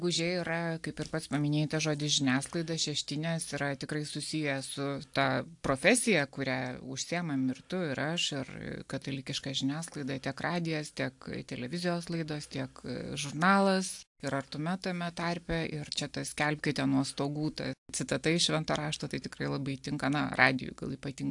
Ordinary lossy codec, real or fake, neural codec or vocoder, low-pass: AAC, 64 kbps; real; none; 10.8 kHz